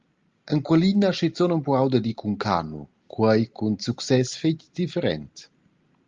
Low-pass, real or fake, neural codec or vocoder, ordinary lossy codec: 7.2 kHz; real; none; Opus, 32 kbps